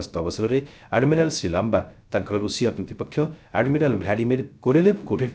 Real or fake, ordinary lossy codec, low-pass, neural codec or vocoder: fake; none; none; codec, 16 kHz, 0.3 kbps, FocalCodec